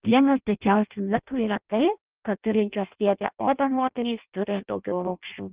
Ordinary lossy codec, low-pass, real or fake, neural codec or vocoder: Opus, 32 kbps; 3.6 kHz; fake; codec, 16 kHz in and 24 kHz out, 0.6 kbps, FireRedTTS-2 codec